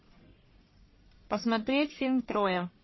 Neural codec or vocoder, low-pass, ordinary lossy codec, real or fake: codec, 44.1 kHz, 1.7 kbps, Pupu-Codec; 7.2 kHz; MP3, 24 kbps; fake